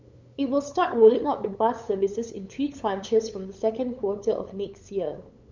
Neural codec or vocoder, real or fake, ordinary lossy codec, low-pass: codec, 16 kHz, 8 kbps, FunCodec, trained on LibriTTS, 25 frames a second; fake; MP3, 64 kbps; 7.2 kHz